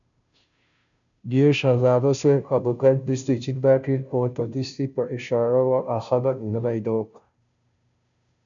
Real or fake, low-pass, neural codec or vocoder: fake; 7.2 kHz; codec, 16 kHz, 0.5 kbps, FunCodec, trained on Chinese and English, 25 frames a second